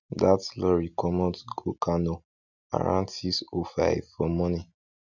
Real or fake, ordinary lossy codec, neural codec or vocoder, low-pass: real; none; none; 7.2 kHz